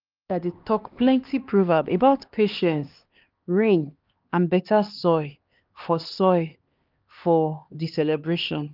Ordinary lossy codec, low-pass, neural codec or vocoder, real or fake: Opus, 32 kbps; 5.4 kHz; codec, 16 kHz, 2 kbps, X-Codec, HuBERT features, trained on LibriSpeech; fake